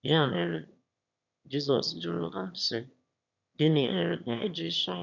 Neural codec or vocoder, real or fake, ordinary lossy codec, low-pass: autoencoder, 22.05 kHz, a latent of 192 numbers a frame, VITS, trained on one speaker; fake; none; 7.2 kHz